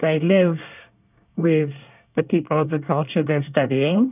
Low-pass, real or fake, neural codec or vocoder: 3.6 kHz; fake; codec, 24 kHz, 1 kbps, SNAC